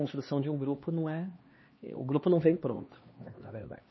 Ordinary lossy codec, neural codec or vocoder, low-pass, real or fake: MP3, 24 kbps; codec, 16 kHz, 2 kbps, X-Codec, HuBERT features, trained on LibriSpeech; 7.2 kHz; fake